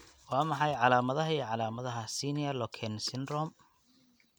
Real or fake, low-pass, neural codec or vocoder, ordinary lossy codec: real; none; none; none